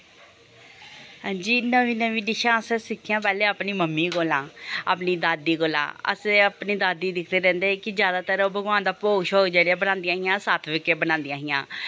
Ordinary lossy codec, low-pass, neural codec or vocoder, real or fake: none; none; none; real